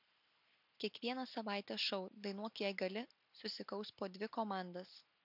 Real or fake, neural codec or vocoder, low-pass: real; none; 5.4 kHz